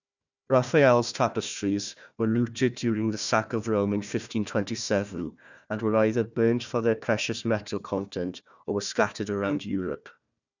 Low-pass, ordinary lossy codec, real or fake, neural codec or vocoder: 7.2 kHz; none; fake; codec, 16 kHz, 1 kbps, FunCodec, trained on Chinese and English, 50 frames a second